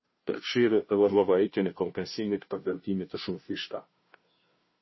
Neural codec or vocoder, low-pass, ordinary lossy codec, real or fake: codec, 16 kHz, 0.5 kbps, FunCodec, trained on Chinese and English, 25 frames a second; 7.2 kHz; MP3, 24 kbps; fake